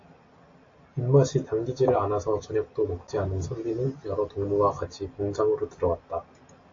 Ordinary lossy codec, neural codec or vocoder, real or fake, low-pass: AAC, 48 kbps; none; real; 7.2 kHz